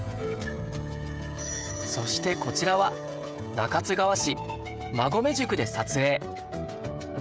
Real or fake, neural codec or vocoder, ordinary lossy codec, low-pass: fake; codec, 16 kHz, 16 kbps, FreqCodec, smaller model; none; none